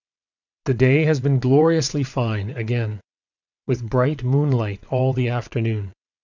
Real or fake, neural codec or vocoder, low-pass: fake; vocoder, 22.05 kHz, 80 mel bands, WaveNeXt; 7.2 kHz